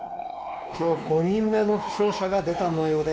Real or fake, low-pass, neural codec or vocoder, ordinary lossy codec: fake; none; codec, 16 kHz, 2 kbps, X-Codec, WavLM features, trained on Multilingual LibriSpeech; none